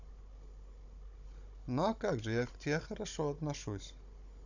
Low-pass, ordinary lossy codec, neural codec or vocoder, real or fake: 7.2 kHz; none; codec, 16 kHz, 16 kbps, FunCodec, trained on Chinese and English, 50 frames a second; fake